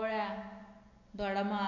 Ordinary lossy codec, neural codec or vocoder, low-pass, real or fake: none; none; 7.2 kHz; real